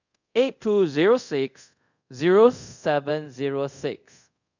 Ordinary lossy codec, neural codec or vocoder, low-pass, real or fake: none; codec, 24 kHz, 0.5 kbps, DualCodec; 7.2 kHz; fake